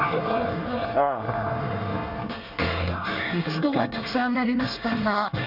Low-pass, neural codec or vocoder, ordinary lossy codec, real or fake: 5.4 kHz; codec, 24 kHz, 1 kbps, SNAC; none; fake